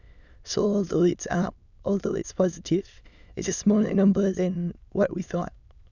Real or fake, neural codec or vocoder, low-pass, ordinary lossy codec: fake; autoencoder, 22.05 kHz, a latent of 192 numbers a frame, VITS, trained on many speakers; 7.2 kHz; none